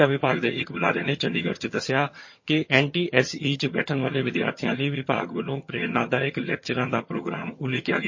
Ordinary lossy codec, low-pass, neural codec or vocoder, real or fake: MP3, 32 kbps; 7.2 kHz; vocoder, 22.05 kHz, 80 mel bands, HiFi-GAN; fake